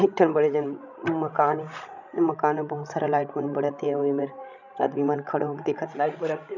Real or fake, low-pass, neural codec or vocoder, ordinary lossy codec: fake; 7.2 kHz; codec, 16 kHz, 16 kbps, FreqCodec, larger model; none